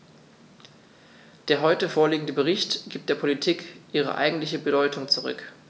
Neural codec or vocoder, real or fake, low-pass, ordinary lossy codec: none; real; none; none